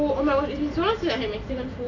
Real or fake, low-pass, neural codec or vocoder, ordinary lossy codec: real; 7.2 kHz; none; none